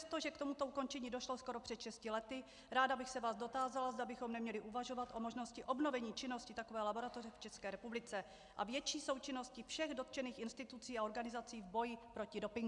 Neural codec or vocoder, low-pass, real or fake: none; 10.8 kHz; real